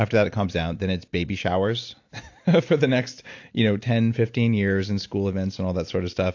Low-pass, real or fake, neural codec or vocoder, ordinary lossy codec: 7.2 kHz; real; none; AAC, 48 kbps